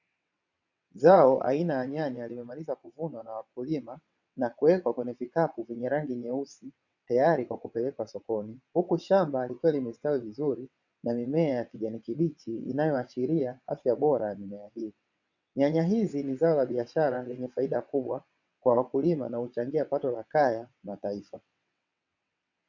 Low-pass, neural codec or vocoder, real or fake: 7.2 kHz; vocoder, 22.05 kHz, 80 mel bands, WaveNeXt; fake